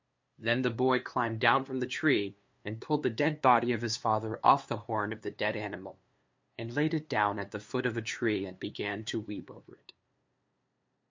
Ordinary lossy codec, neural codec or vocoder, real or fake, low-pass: MP3, 48 kbps; codec, 16 kHz, 2 kbps, FunCodec, trained on LibriTTS, 25 frames a second; fake; 7.2 kHz